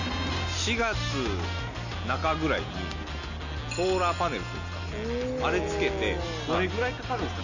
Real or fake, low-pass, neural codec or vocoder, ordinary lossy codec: real; 7.2 kHz; none; Opus, 64 kbps